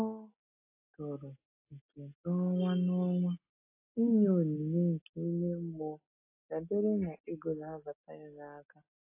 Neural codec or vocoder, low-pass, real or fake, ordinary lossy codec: none; 3.6 kHz; real; AAC, 32 kbps